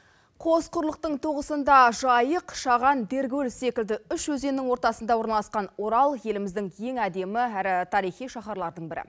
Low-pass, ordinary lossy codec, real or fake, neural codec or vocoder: none; none; real; none